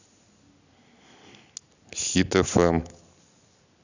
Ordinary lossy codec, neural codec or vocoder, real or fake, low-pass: none; none; real; 7.2 kHz